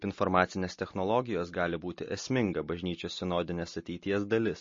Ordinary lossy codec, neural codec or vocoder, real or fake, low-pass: MP3, 32 kbps; none; real; 7.2 kHz